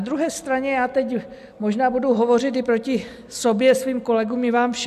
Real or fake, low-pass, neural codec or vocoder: real; 14.4 kHz; none